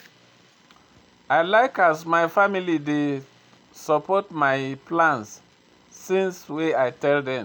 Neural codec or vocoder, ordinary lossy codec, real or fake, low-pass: none; none; real; 19.8 kHz